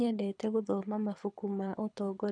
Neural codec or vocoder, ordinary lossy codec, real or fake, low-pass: codec, 24 kHz, 6 kbps, HILCodec; none; fake; 9.9 kHz